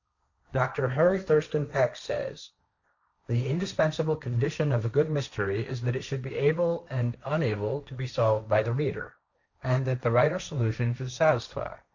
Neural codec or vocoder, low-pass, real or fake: codec, 16 kHz, 1.1 kbps, Voila-Tokenizer; 7.2 kHz; fake